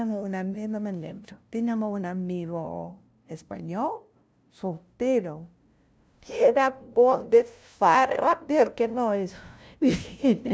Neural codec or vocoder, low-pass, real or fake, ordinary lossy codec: codec, 16 kHz, 0.5 kbps, FunCodec, trained on LibriTTS, 25 frames a second; none; fake; none